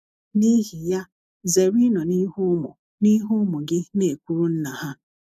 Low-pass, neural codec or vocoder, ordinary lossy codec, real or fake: 14.4 kHz; none; AAC, 96 kbps; real